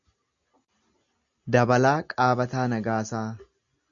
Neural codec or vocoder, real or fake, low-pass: none; real; 7.2 kHz